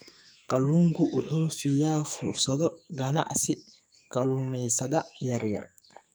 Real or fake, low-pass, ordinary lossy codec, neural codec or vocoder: fake; none; none; codec, 44.1 kHz, 2.6 kbps, SNAC